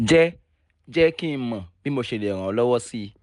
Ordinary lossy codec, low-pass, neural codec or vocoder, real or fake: none; 10.8 kHz; none; real